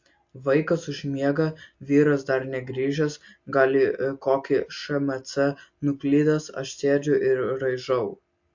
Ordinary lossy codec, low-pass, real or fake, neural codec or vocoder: MP3, 48 kbps; 7.2 kHz; real; none